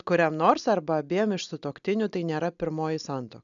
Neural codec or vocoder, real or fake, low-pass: none; real; 7.2 kHz